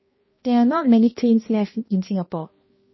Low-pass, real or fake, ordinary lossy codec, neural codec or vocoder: 7.2 kHz; fake; MP3, 24 kbps; codec, 16 kHz, 1 kbps, X-Codec, HuBERT features, trained on balanced general audio